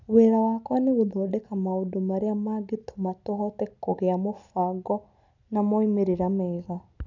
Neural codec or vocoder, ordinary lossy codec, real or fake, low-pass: none; none; real; 7.2 kHz